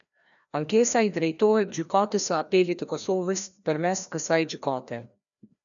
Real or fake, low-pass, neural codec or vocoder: fake; 7.2 kHz; codec, 16 kHz, 1 kbps, FreqCodec, larger model